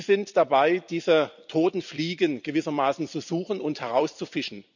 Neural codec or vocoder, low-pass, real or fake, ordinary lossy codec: vocoder, 44.1 kHz, 128 mel bands every 256 samples, BigVGAN v2; 7.2 kHz; fake; none